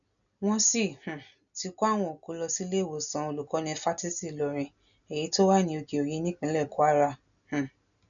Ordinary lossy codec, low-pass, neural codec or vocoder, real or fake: none; 7.2 kHz; none; real